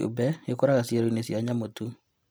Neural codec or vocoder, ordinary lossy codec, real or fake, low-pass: none; none; real; none